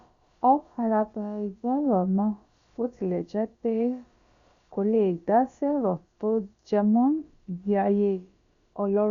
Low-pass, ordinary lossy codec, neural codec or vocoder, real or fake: 7.2 kHz; Opus, 64 kbps; codec, 16 kHz, about 1 kbps, DyCAST, with the encoder's durations; fake